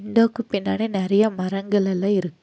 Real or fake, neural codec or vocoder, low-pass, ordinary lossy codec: real; none; none; none